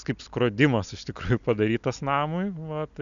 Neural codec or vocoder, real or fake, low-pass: none; real; 7.2 kHz